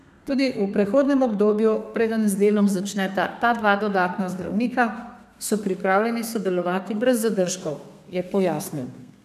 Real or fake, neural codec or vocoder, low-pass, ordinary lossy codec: fake; codec, 32 kHz, 1.9 kbps, SNAC; 14.4 kHz; none